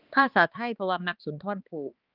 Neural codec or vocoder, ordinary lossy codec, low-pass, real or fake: codec, 16 kHz, 2 kbps, X-Codec, HuBERT features, trained on balanced general audio; Opus, 32 kbps; 5.4 kHz; fake